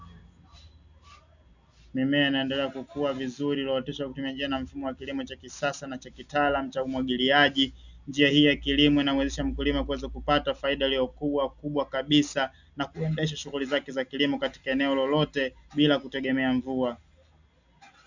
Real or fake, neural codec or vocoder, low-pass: real; none; 7.2 kHz